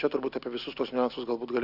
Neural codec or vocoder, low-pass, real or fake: none; 5.4 kHz; real